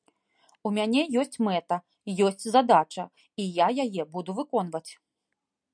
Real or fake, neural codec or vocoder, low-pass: real; none; 9.9 kHz